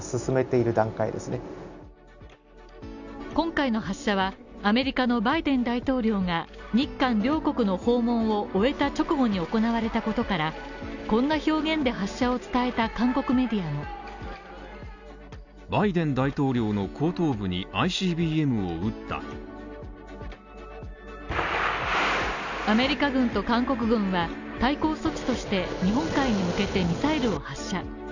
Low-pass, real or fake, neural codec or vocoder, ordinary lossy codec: 7.2 kHz; real; none; none